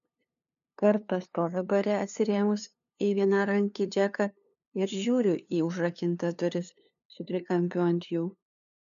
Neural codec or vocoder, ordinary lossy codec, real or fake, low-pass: codec, 16 kHz, 2 kbps, FunCodec, trained on LibriTTS, 25 frames a second; MP3, 96 kbps; fake; 7.2 kHz